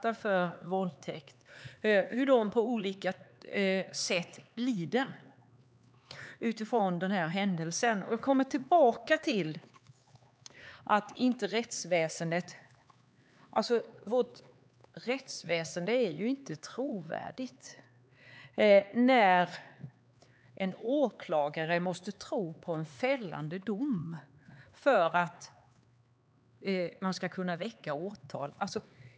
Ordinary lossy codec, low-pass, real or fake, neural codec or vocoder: none; none; fake; codec, 16 kHz, 4 kbps, X-Codec, HuBERT features, trained on LibriSpeech